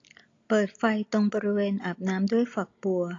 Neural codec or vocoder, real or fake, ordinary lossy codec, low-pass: none; real; AAC, 32 kbps; 7.2 kHz